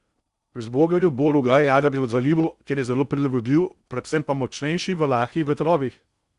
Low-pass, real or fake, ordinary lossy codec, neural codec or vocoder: 10.8 kHz; fake; Opus, 64 kbps; codec, 16 kHz in and 24 kHz out, 0.6 kbps, FocalCodec, streaming, 4096 codes